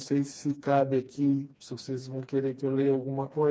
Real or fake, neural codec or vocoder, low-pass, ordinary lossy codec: fake; codec, 16 kHz, 2 kbps, FreqCodec, smaller model; none; none